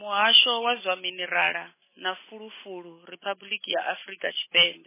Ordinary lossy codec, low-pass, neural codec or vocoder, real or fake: MP3, 16 kbps; 3.6 kHz; none; real